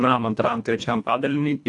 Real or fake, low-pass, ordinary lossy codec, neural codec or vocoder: fake; 10.8 kHz; AAC, 64 kbps; codec, 24 kHz, 1.5 kbps, HILCodec